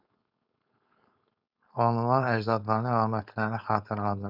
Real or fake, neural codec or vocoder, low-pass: fake; codec, 16 kHz, 4.8 kbps, FACodec; 5.4 kHz